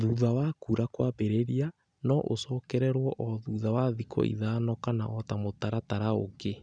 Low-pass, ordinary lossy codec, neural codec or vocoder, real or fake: 9.9 kHz; none; none; real